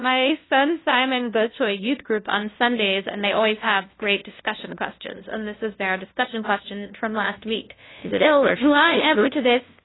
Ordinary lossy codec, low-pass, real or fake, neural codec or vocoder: AAC, 16 kbps; 7.2 kHz; fake; codec, 16 kHz, 0.5 kbps, FunCodec, trained on LibriTTS, 25 frames a second